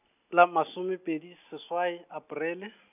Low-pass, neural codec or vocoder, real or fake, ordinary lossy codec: 3.6 kHz; none; real; none